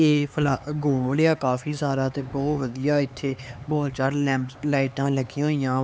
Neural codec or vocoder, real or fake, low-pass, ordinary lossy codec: codec, 16 kHz, 4 kbps, X-Codec, HuBERT features, trained on LibriSpeech; fake; none; none